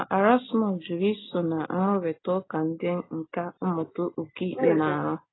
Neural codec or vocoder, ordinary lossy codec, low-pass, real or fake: none; AAC, 16 kbps; 7.2 kHz; real